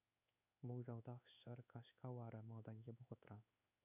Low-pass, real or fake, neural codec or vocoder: 3.6 kHz; fake; codec, 16 kHz in and 24 kHz out, 1 kbps, XY-Tokenizer